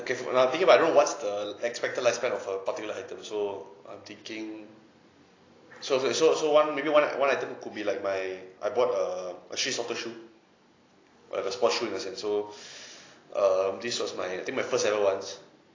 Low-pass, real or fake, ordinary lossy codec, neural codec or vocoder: 7.2 kHz; real; AAC, 32 kbps; none